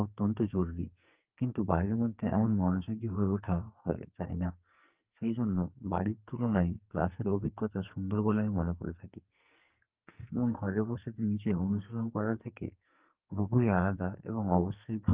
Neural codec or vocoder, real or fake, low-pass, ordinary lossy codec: codec, 44.1 kHz, 2.6 kbps, SNAC; fake; 3.6 kHz; Opus, 32 kbps